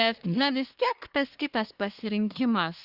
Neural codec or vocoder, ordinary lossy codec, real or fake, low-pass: codec, 24 kHz, 1 kbps, SNAC; Opus, 64 kbps; fake; 5.4 kHz